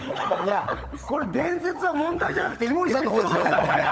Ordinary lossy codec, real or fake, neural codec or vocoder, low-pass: none; fake; codec, 16 kHz, 16 kbps, FunCodec, trained on LibriTTS, 50 frames a second; none